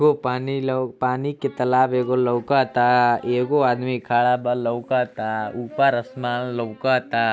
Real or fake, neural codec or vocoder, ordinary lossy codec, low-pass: real; none; none; none